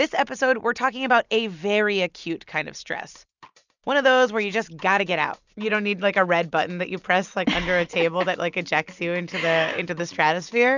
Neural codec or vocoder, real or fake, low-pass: none; real; 7.2 kHz